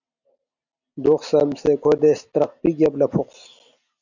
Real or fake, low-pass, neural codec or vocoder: real; 7.2 kHz; none